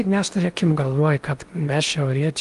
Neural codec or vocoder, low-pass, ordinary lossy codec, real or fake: codec, 16 kHz in and 24 kHz out, 0.8 kbps, FocalCodec, streaming, 65536 codes; 10.8 kHz; Opus, 32 kbps; fake